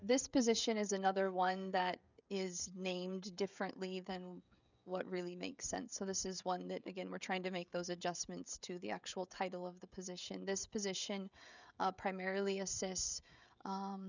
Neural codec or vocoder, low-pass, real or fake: codec, 16 kHz, 16 kbps, FreqCodec, smaller model; 7.2 kHz; fake